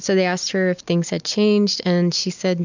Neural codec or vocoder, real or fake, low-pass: none; real; 7.2 kHz